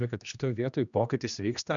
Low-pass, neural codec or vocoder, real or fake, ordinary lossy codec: 7.2 kHz; codec, 16 kHz, 1 kbps, X-Codec, HuBERT features, trained on general audio; fake; MP3, 96 kbps